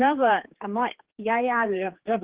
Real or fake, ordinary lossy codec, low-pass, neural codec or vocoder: fake; Opus, 16 kbps; 3.6 kHz; codec, 16 kHz, 4 kbps, X-Codec, HuBERT features, trained on general audio